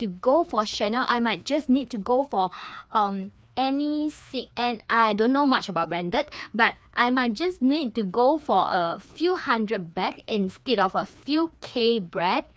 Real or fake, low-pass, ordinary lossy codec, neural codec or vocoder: fake; none; none; codec, 16 kHz, 2 kbps, FreqCodec, larger model